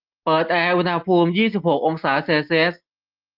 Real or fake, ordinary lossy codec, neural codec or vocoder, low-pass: real; Opus, 24 kbps; none; 5.4 kHz